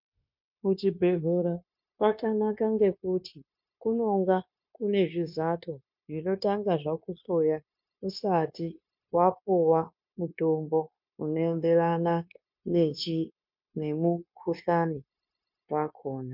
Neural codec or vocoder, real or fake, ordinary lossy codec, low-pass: codec, 16 kHz, 0.9 kbps, LongCat-Audio-Codec; fake; MP3, 48 kbps; 5.4 kHz